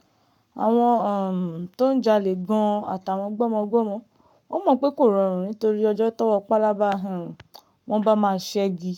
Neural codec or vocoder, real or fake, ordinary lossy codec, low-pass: codec, 44.1 kHz, 7.8 kbps, Pupu-Codec; fake; MP3, 96 kbps; 19.8 kHz